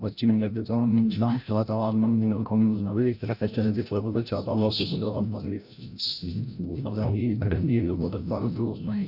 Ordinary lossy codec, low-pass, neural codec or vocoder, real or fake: none; 5.4 kHz; codec, 16 kHz, 0.5 kbps, FreqCodec, larger model; fake